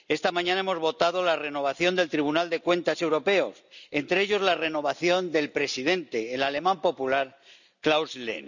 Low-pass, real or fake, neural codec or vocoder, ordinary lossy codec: 7.2 kHz; real; none; none